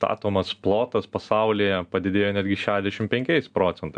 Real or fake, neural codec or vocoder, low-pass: real; none; 9.9 kHz